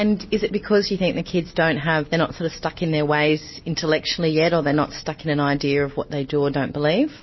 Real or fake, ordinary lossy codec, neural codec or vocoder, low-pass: real; MP3, 24 kbps; none; 7.2 kHz